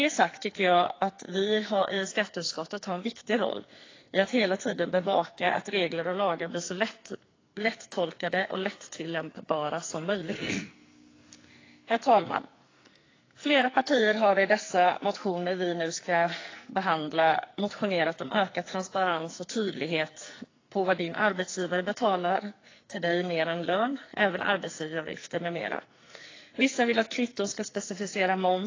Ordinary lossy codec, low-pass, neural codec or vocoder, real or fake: AAC, 32 kbps; 7.2 kHz; codec, 44.1 kHz, 2.6 kbps, SNAC; fake